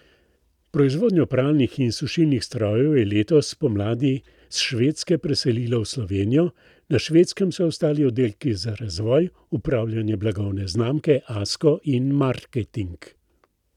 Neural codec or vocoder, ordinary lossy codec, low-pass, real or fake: none; none; 19.8 kHz; real